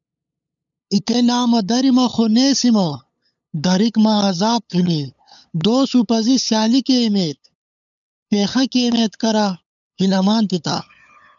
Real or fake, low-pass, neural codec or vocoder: fake; 7.2 kHz; codec, 16 kHz, 8 kbps, FunCodec, trained on LibriTTS, 25 frames a second